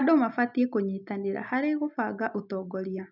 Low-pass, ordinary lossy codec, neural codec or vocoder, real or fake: 5.4 kHz; none; none; real